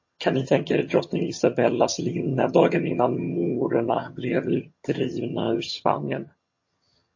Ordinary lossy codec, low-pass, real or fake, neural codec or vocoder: MP3, 32 kbps; 7.2 kHz; fake; vocoder, 22.05 kHz, 80 mel bands, HiFi-GAN